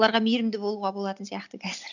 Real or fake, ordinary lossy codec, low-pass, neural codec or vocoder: real; none; 7.2 kHz; none